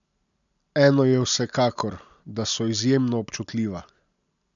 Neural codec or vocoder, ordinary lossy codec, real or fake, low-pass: none; none; real; 7.2 kHz